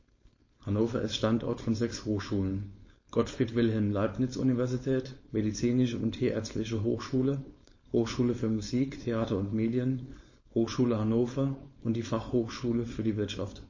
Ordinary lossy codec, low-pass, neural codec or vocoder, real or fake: MP3, 32 kbps; 7.2 kHz; codec, 16 kHz, 4.8 kbps, FACodec; fake